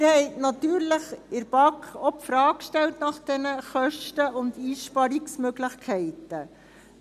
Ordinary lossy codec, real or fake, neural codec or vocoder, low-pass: none; real; none; 14.4 kHz